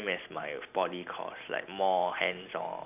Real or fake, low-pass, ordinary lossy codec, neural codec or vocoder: real; 3.6 kHz; none; none